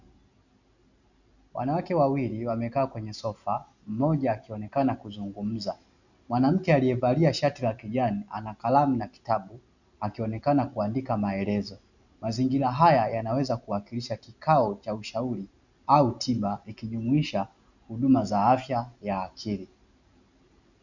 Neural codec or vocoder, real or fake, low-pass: none; real; 7.2 kHz